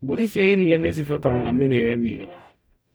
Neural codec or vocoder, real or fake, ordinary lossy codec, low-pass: codec, 44.1 kHz, 0.9 kbps, DAC; fake; none; none